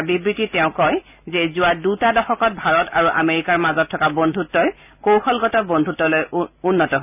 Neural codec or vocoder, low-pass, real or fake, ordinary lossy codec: none; 3.6 kHz; real; none